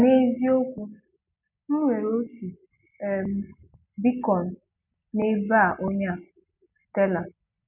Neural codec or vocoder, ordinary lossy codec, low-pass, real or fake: none; none; 3.6 kHz; real